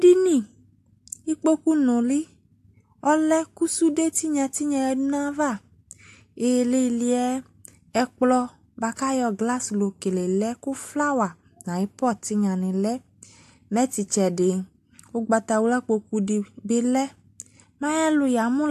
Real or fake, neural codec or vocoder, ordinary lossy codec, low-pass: real; none; AAC, 64 kbps; 14.4 kHz